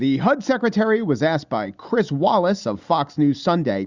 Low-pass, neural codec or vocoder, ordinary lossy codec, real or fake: 7.2 kHz; none; Opus, 64 kbps; real